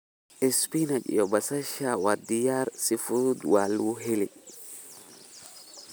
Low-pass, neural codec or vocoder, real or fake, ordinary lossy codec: none; none; real; none